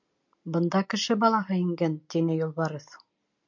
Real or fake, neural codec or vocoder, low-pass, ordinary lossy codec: real; none; 7.2 kHz; MP3, 64 kbps